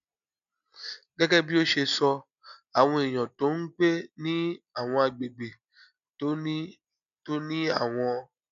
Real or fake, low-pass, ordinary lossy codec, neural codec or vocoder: real; 7.2 kHz; none; none